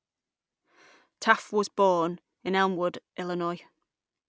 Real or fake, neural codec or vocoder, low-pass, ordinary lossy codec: real; none; none; none